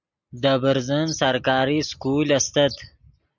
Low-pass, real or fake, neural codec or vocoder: 7.2 kHz; real; none